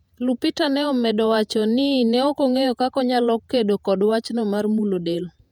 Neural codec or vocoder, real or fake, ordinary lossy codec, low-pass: vocoder, 48 kHz, 128 mel bands, Vocos; fake; none; 19.8 kHz